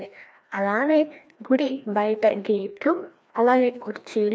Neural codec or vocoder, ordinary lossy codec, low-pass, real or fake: codec, 16 kHz, 1 kbps, FreqCodec, larger model; none; none; fake